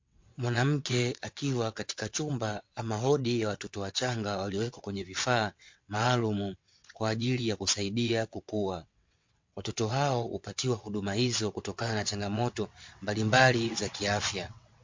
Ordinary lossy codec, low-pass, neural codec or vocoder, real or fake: MP3, 48 kbps; 7.2 kHz; vocoder, 22.05 kHz, 80 mel bands, WaveNeXt; fake